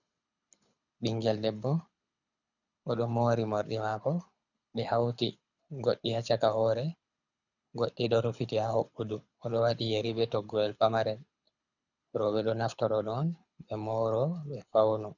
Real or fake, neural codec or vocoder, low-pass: fake; codec, 24 kHz, 6 kbps, HILCodec; 7.2 kHz